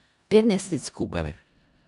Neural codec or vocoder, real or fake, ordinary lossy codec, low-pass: codec, 16 kHz in and 24 kHz out, 0.4 kbps, LongCat-Audio-Codec, four codebook decoder; fake; none; 10.8 kHz